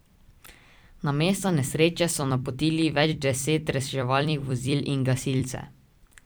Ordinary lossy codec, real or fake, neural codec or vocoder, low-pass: none; fake; vocoder, 44.1 kHz, 128 mel bands every 256 samples, BigVGAN v2; none